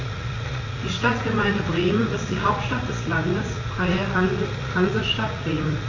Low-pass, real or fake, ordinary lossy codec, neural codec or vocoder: 7.2 kHz; fake; AAC, 32 kbps; vocoder, 44.1 kHz, 80 mel bands, Vocos